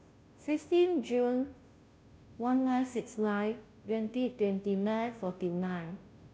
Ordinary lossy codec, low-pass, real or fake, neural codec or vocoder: none; none; fake; codec, 16 kHz, 0.5 kbps, FunCodec, trained on Chinese and English, 25 frames a second